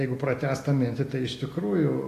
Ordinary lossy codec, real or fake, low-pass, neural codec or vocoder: AAC, 48 kbps; real; 14.4 kHz; none